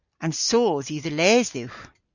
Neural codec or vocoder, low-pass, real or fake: none; 7.2 kHz; real